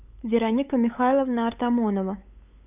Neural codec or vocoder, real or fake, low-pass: codec, 16 kHz, 8 kbps, FunCodec, trained on LibriTTS, 25 frames a second; fake; 3.6 kHz